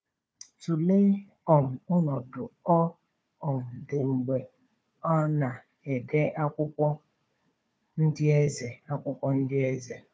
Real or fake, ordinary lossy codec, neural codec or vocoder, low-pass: fake; none; codec, 16 kHz, 4 kbps, FunCodec, trained on Chinese and English, 50 frames a second; none